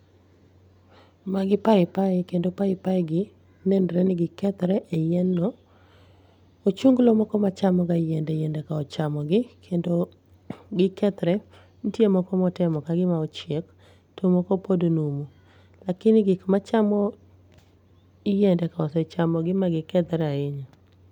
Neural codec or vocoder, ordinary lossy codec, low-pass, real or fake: none; none; 19.8 kHz; real